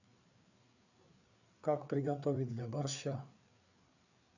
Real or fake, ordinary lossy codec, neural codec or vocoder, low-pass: fake; none; codec, 16 kHz, 4 kbps, FreqCodec, larger model; 7.2 kHz